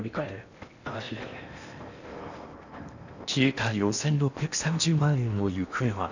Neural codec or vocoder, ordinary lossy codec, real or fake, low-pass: codec, 16 kHz in and 24 kHz out, 0.8 kbps, FocalCodec, streaming, 65536 codes; none; fake; 7.2 kHz